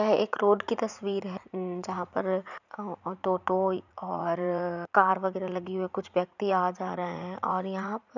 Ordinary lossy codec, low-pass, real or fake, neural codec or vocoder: none; 7.2 kHz; fake; vocoder, 44.1 kHz, 80 mel bands, Vocos